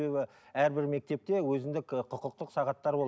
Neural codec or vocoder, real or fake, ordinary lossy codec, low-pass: none; real; none; none